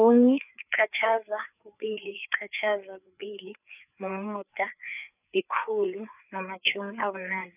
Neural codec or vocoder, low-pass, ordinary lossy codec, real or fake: codec, 16 kHz, 4 kbps, FreqCodec, larger model; 3.6 kHz; none; fake